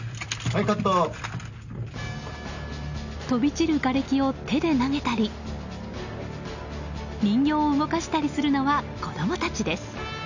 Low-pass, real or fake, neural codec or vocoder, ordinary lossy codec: 7.2 kHz; real; none; none